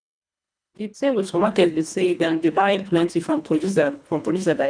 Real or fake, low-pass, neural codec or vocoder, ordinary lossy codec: fake; 9.9 kHz; codec, 24 kHz, 1.5 kbps, HILCodec; none